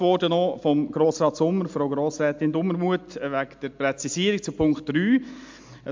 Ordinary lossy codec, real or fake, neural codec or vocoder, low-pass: MP3, 64 kbps; real; none; 7.2 kHz